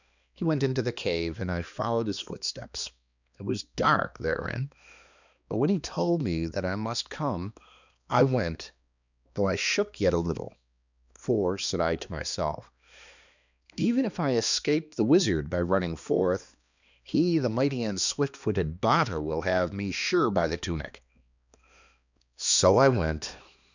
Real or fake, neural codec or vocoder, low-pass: fake; codec, 16 kHz, 2 kbps, X-Codec, HuBERT features, trained on balanced general audio; 7.2 kHz